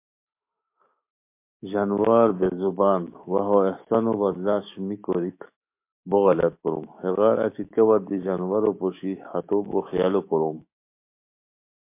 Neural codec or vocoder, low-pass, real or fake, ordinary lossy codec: autoencoder, 48 kHz, 128 numbers a frame, DAC-VAE, trained on Japanese speech; 3.6 kHz; fake; MP3, 24 kbps